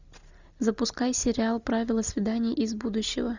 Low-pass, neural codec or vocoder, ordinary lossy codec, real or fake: 7.2 kHz; none; Opus, 64 kbps; real